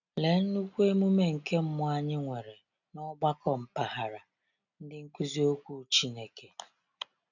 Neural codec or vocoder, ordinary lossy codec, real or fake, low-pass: none; none; real; 7.2 kHz